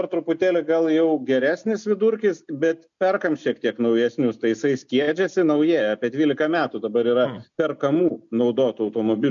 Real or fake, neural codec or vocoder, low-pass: real; none; 7.2 kHz